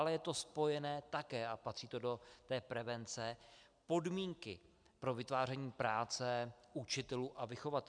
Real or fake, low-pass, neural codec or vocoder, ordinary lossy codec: real; 9.9 kHz; none; MP3, 96 kbps